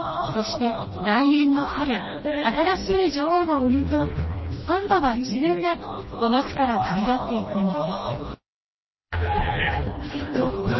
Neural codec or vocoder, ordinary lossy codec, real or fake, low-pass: codec, 16 kHz, 1 kbps, FreqCodec, smaller model; MP3, 24 kbps; fake; 7.2 kHz